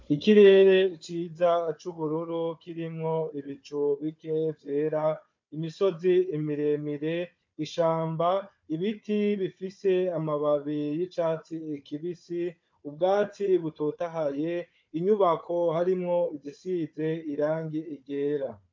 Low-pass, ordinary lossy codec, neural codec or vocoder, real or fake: 7.2 kHz; MP3, 48 kbps; codec, 16 kHz, 4 kbps, FunCodec, trained on Chinese and English, 50 frames a second; fake